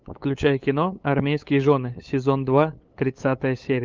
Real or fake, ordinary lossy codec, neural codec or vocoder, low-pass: fake; Opus, 24 kbps; codec, 16 kHz, 8 kbps, FunCodec, trained on LibriTTS, 25 frames a second; 7.2 kHz